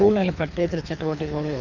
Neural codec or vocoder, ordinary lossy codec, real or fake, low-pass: codec, 24 kHz, 3 kbps, HILCodec; none; fake; 7.2 kHz